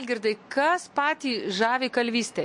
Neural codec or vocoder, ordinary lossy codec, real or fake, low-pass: none; MP3, 48 kbps; real; 10.8 kHz